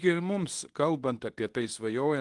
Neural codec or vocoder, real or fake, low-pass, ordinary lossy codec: codec, 24 kHz, 0.9 kbps, WavTokenizer, medium speech release version 2; fake; 10.8 kHz; Opus, 24 kbps